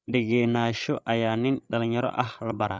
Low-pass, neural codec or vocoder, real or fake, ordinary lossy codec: none; codec, 16 kHz, 16 kbps, FreqCodec, larger model; fake; none